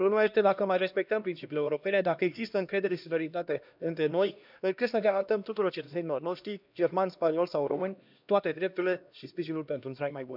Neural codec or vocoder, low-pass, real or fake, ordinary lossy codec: codec, 16 kHz, 1 kbps, X-Codec, HuBERT features, trained on LibriSpeech; 5.4 kHz; fake; none